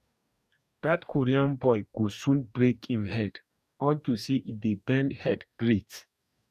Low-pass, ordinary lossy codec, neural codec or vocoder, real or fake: 14.4 kHz; none; codec, 44.1 kHz, 2.6 kbps, DAC; fake